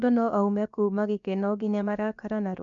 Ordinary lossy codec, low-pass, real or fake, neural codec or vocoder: none; 7.2 kHz; fake; codec, 16 kHz, about 1 kbps, DyCAST, with the encoder's durations